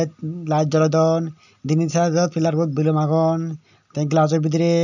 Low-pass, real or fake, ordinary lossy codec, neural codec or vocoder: 7.2 kHz; real; none; none